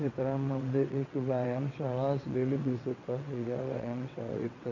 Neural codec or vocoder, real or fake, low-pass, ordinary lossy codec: vocoder, 22.05 kHz, 80 mel bands, WaveNeXt; fake; 7.2 kHz; none